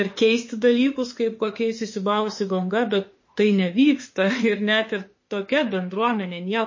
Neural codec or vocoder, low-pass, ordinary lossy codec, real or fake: autoencoder, 48 kHz, 32 numbers a frame, DAC-VAE, trained on Japanese speech; 7.2 kHz; MP3, 32 kbps; fake